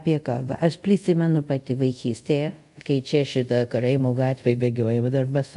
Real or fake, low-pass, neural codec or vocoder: fake; 10.8 kHz; codec, 24 kHz, 0.5 kbps, DualCodec